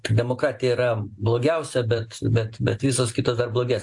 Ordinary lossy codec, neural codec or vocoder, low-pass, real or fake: AAC, 64 kbps; none; 10.8 kHz; real